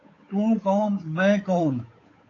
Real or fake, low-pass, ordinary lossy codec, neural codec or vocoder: fake; 7.2 kHz; AAC, 32 kbps; codec, 16 kHz, 8 kbps, FunCodec, trained on Chinese and English, 25 frames a second